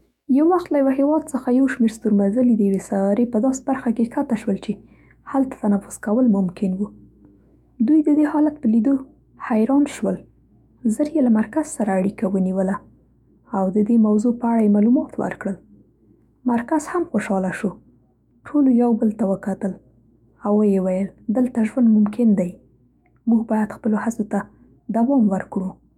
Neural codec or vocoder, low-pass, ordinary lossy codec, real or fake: autoencoder, 48 kHz, 128 numbers a frame, DAC-VAE, trained on Japanese speech; 19.8 kHz; none; fake